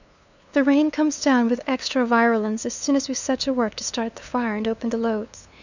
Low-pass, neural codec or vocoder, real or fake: 7.2 kHz; codec, 16 kHz, 2 kbps, FunCodec, trained on LibriTTS, 25 frames a second; fake